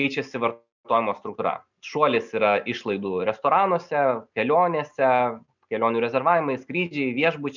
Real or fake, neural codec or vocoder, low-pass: real; none; 7.2 kHz